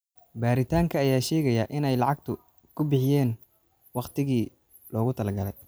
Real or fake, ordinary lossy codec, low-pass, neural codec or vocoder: real; none; none; none